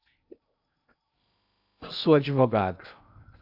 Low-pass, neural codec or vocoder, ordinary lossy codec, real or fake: 5.4 kHz; codec, 16 kHz in and 24 kHz out, 0.6 kbps, FocalCodec, streaming, 2048 codes; AAC, 48 kbps; fake